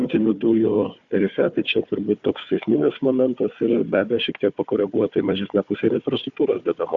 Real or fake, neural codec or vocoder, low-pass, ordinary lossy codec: fake; codec, 16 kHz, 4 kbps, FunCodec, trained on Chinese and English, 50 frames a second; 7.2 kHz; Opus, 64 kbps